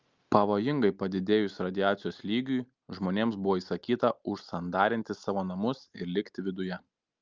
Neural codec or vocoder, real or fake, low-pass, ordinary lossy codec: none; real; 7.2 kHz; Opus, 24 kbps